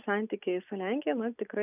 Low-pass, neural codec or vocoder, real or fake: 3.6 kHz; none; real